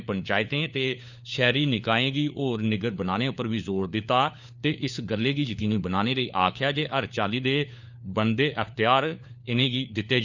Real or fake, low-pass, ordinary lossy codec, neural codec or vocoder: fake; 7.2 kHz; none; codec, 16 kHz, 4 kbps, FunCodec, trained on LibriTTS, 50 frames a second